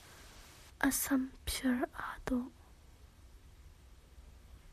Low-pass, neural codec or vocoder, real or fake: 14.4 kHz; vocoder, 44.1 kHz, 128 mel bands, Pupu-Vocoder; fake